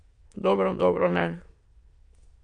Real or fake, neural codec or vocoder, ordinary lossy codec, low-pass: fake; autoencoder, 22.05 kHz, a latent of 192 numbers a frame, VITS, trained on many speakers; MP3, 48 kbps; 9.9 kHz